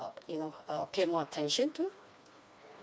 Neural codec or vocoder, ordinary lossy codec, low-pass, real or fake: codec, 16 kHz, 2 kbps, FreqCodec, smaller model; none; none; fake